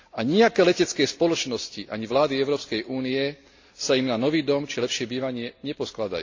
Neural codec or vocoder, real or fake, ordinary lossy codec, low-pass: none; real; AAC, 48 kbps; 7.2 kHz